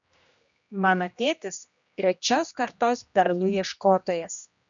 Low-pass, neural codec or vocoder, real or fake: 7.2 kHz; codec, 16 kHz, 1 kbps, X-Codec, HuBERT features, trained on general audio; fake